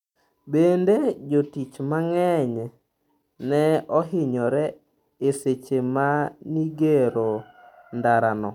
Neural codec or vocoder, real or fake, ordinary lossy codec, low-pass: none; real; none; 19.8 kHz